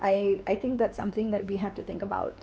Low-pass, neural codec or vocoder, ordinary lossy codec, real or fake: none; codec, 16 kHz, 2 kbps, X-Codec, WavLM features, trained on Multilingual LibriSpeech; none; fake